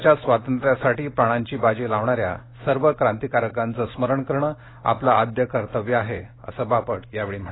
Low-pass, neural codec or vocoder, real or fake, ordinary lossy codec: 7.2 kHz; none; real; AAC, 16 kbps